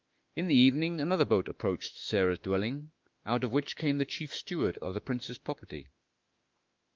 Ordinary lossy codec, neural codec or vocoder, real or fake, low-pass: Opus, 24 kbps; autoencoder, 48 kHz, 32 numbers a frame, DAC-VAE, trained on Japanese speech; fake; 7.2 kHz